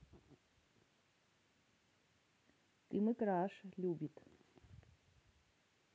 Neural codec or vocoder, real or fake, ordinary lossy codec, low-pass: none; real; none; none